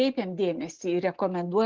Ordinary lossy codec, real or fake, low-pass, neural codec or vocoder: Opus, 16 kbps; real; 7.2 kHz; none